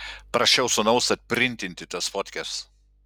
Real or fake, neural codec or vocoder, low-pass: real; none; 19.8 kHz